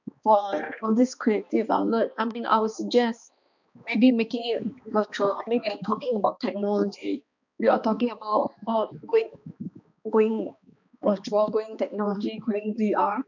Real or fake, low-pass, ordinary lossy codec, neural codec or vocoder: fake; 7.2 kHz; none; codec, 16 kHz, 2 kbps, X-Codec, HuBERT features, trained on balanced general audio